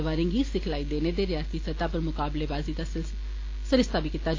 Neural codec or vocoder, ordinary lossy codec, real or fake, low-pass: none; AAC, 32 kbps; real; 7.2 kHz